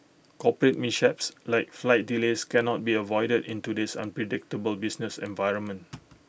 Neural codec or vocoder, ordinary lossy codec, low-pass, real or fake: none; none; none; real